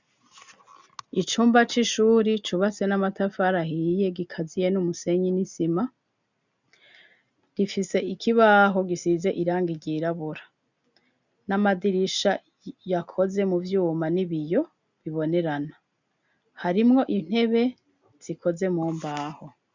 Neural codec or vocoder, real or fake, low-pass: none; real; 7.2 kHz